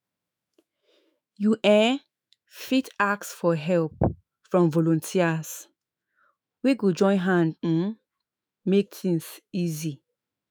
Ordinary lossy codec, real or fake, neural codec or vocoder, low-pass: none; fake; autoencoder, 48 kHz, 128 numbers a frame, DAC-VAE, trained on Japanese speech; none